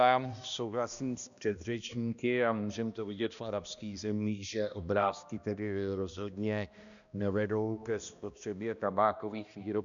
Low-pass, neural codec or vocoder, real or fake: 7.2 kHz; codec, 16 kHz, 1 kbps, X-Codec, HuBERT features, trained on balanced general audio; fake